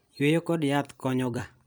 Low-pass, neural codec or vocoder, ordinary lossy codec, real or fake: none; vocoder, 44.1 kHz, 128 mel bands every 256 samples, BigVGAN v2; none; fake